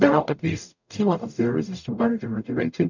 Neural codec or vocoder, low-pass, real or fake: codec, 44.1 kHz, 0.9 kbps, DAC; 7.2 kHz; fake